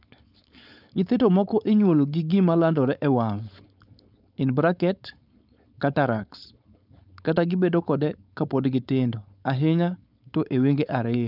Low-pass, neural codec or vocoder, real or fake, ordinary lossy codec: 5.4 kHz; codec, 16 kHz, 4.8 kbps, FACodec; fake; none